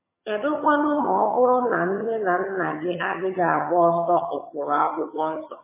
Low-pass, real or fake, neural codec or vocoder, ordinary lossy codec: 3.6 kHz; fake; vocoder, 22.05 kHz, 80 mel bands, HiFi-GAN; MP3, 16 kbps